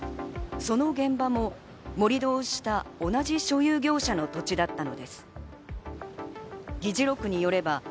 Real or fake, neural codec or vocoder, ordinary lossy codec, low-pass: real; none; none; none